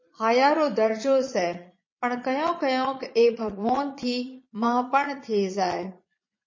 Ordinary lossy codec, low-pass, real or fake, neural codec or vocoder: MP3, 32 kbps; 7.2 kHz; fake; vocoder, 22.05 kHz, 80 mel bands, Vocos